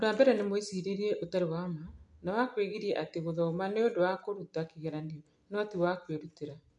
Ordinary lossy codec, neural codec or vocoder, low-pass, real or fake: MP3, 64 kbps; none; 10.8 kHz; real